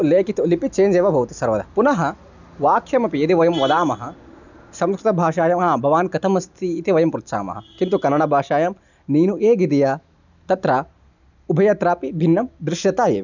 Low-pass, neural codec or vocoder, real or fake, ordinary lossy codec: 7.2 kHz; none; real; none